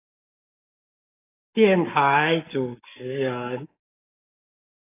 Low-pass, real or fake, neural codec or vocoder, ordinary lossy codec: 3.6 kHz; fake; codec, 44.1 kHz, 7.8 kbps, Pupu-Codec; AAC, 16 kbps